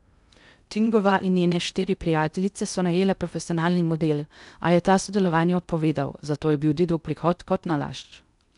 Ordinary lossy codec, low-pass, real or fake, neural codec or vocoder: none; 10.8 kHz; fake; codec, 16 kHz in and 24 kHz out, 0.6 kbps, FocalCodec, streaming, 2048 codes